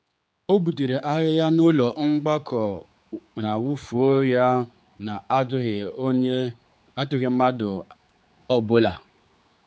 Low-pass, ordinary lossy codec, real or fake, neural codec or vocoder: none; none; fake; codec, 16 kHz, 4 kbps, X-Codec, HuBERT features, trained on general audio